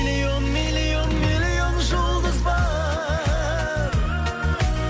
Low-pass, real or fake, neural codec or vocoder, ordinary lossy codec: none; real; none; none